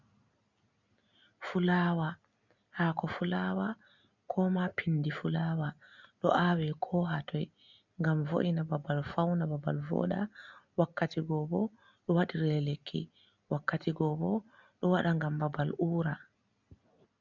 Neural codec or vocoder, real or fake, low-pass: none; real; 7.2 kHz